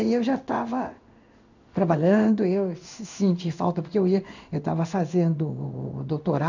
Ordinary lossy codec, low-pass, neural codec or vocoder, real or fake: none; 7.2 kHz; codec, 16 kHz in and 24 kHz out, 1 kbps, XY-Tokenizer; fake